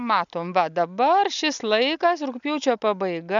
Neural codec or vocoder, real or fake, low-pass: none; real; 7.2 kHz